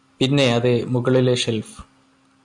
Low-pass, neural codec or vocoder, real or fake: 10.8 kHz; none; real